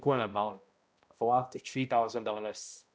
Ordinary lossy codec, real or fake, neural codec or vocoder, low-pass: none; fake; codec, 16 kHz, 0.5 kbps, X-Codec, HuBERT features, trained on balanced general audio; none